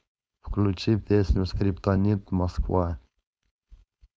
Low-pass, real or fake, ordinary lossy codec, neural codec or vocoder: none; fake; none; codec, 16 kHz, 4.8 kbps, FACodec